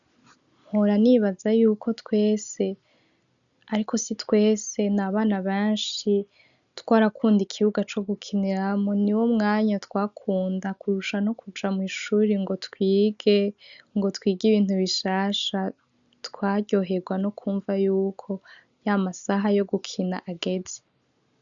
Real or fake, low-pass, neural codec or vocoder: real; 7.2 kHz; none